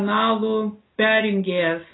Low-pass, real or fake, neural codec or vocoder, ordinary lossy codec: 7.2 kHz; real; none; AAC, 16 kbps